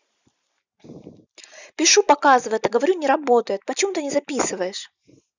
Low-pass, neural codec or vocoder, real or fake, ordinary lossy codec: 7.2 kHz; none; real; none